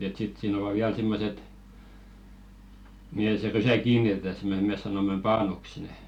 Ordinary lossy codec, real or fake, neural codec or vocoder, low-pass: none; real; none; 19.8 kHz